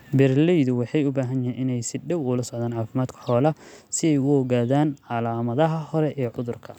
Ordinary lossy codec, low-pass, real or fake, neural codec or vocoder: none; 19.8 kHz; real; none